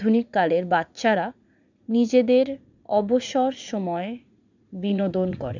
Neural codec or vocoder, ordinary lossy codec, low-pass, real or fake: vocoder, 44.1 kHz, 80 mel bands, Vocos; none; 7.2 kHz; fake